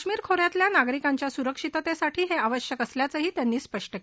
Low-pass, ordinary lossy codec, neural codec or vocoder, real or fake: none; none; none; real